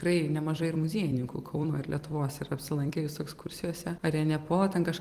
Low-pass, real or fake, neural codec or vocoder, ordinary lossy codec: 14.4 kHz; fake; vocoder, 44.1 kHz, 128 mel bands every 256 samples, BigVGAN v2; Opus, 24 kbps